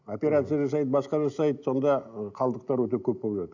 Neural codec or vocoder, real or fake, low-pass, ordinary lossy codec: none; real; 7.2 kHz; none